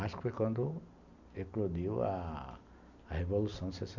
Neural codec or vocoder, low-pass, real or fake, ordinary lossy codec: none; 7.2 kHz; real; none